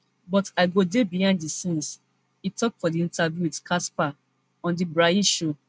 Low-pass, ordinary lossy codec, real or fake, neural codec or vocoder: none; none; real; none